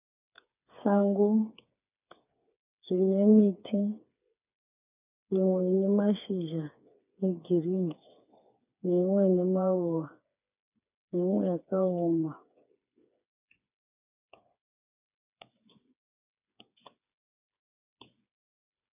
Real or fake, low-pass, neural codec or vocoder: fake; 3.6 kHz; codec, 16 kHz, 4 kbps, FreqCodec, smaller model